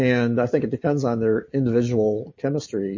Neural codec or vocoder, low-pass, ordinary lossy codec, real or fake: none; 7.2 kHz; MP3, 32 kbps; real